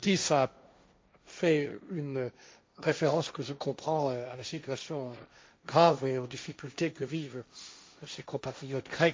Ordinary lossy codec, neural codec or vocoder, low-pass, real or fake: none; codec, 16 kHz, 1.1 kbps, Voila-Tokenizer; none; fake